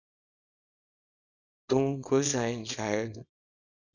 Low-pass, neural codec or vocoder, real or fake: 7.2 kHz; codec, 24 kHz, 0.9 kbps, WavTokenizer, small release; fake